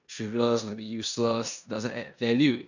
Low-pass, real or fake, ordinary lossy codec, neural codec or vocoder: 7.2 kHz; fake; none; codec, 16 kHz in and 24 kHz out, 0.9 kbps, LongCat-Audio-Codec, four codebook decoder